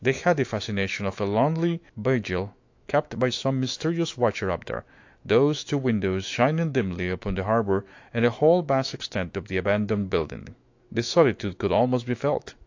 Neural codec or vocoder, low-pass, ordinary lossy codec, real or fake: autoencoder, 48 kHz, 128 numbers a frame, DAC-VAE, trained on Japanese speech; 7.2 kHz; AAC, 48 kbps; fake